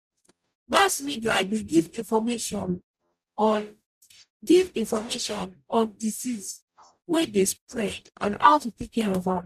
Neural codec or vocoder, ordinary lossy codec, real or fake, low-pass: codec, 44.1 kHz, 0.9 kbps, DAC; none; fake; 14.4 kHz